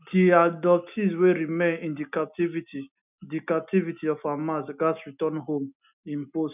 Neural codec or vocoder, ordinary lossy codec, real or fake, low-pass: none; none; real; 3.6 kHz